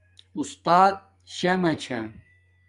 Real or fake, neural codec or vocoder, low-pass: fake; codec, 44.1 kHz, 2.6 kbps, SNAC; 10.8 kHz